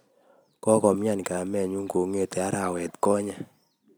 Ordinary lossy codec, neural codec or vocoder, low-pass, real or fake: none; none; none; real